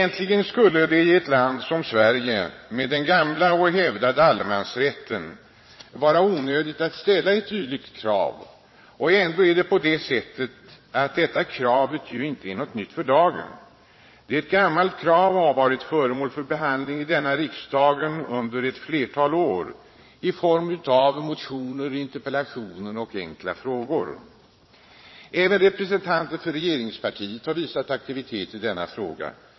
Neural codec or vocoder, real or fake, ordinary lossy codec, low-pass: vocoder, 22.05 kHz, 80 mel bands, Vocos; fake; MP3, 24 kbps; 7.2 kHz